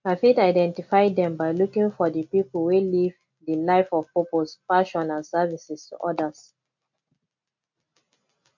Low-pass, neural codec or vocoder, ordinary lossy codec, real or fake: 7.2 kHz; none; MP3, 48 kbps; real